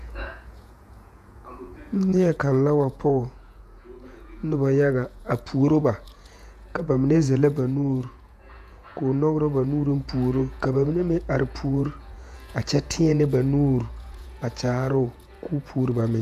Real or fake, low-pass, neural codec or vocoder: fake; 14.4 kHz; vocoder, 48 kHz, 128 mel bands, Vocos